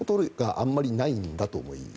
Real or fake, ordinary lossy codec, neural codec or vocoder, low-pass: real; none; none; none